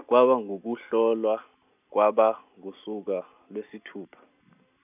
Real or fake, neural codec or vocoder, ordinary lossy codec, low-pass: real; none; none; 3.6 kHz